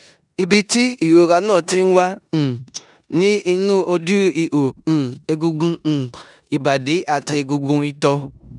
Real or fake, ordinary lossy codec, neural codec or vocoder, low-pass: fake; none; codec, 16 kHz in and 24 kHz out, 0.9 kbps, LongCat-Audio-Codec, four codebook decoder; 10.8 kHz